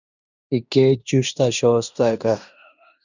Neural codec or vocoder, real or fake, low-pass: codec, 16 kHz in and 24 kHz out, 0.9 kbps, LongCat-Audio-Codec, fine tuned four codebook decoder; fake; 7.2 kHz